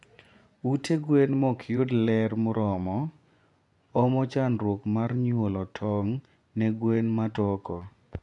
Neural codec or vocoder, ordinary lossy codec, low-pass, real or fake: vocoder, 24 kHz, 100 mel bands, Vocos; none; 10.8 kHz; fake